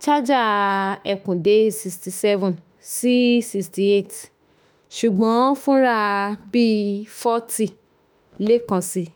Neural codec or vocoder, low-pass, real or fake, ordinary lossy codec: autoencoder, 48 kHz, 32 numbers a frame, DAC-VAE, trained on Japanese speech; none; fake; none